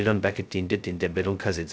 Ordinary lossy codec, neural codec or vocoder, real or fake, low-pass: none; codec, 16 kHz, 0.2 kbps, FocalCodec; fake; none